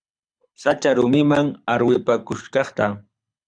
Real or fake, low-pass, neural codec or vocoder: fake; 9.9 kHz; codec, 24 kHz, 6 kbps, HILCodec